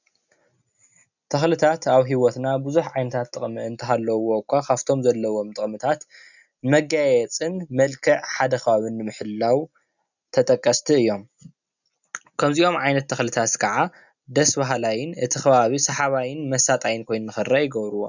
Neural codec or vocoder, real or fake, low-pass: none; real; 7.2 kHz